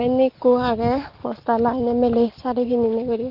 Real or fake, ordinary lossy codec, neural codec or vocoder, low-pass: real; Opus, 16 kbps; none; 5.4 kHz